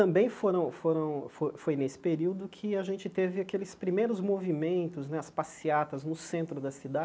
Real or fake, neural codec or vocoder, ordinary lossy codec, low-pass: real; none; none; none